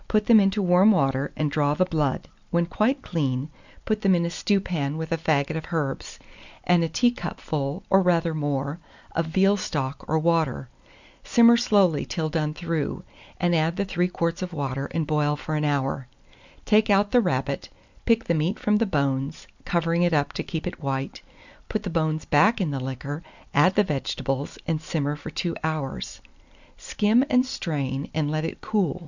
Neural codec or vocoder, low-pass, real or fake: none; 7.2 kHz; real